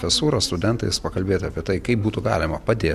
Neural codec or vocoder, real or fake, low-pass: none; real; 14.4 kHz